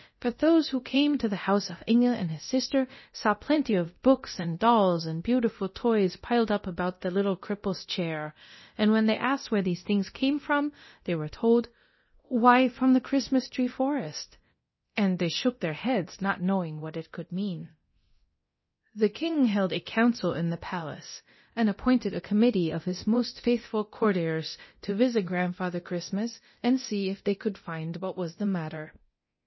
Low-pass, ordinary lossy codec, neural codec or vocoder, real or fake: 7.2 kHz; MP3, 24 kbps; codec, 24 kHz, 0.9 kbps, DualCodec; fake